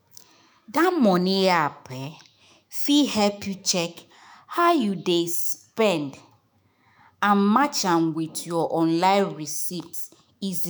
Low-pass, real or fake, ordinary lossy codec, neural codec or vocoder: none; fake; none; autoencoder, 48 kHz, 128 numbers a frame, DAC-VAE, trained on Japanese speech